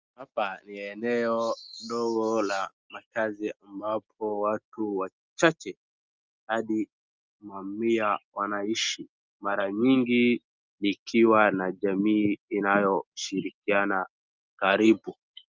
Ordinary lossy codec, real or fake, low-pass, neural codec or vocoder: Opus, 24 kbps; real; 7.2 kHz; none